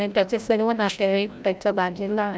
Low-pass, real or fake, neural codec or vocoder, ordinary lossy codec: none; fake; codec, 16 kHz, 0.5 kbps, FreqCodec, larger model; none